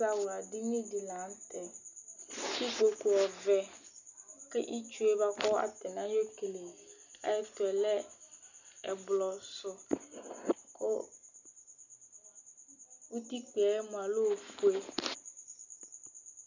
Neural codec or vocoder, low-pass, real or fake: none; 7.2 kHz; real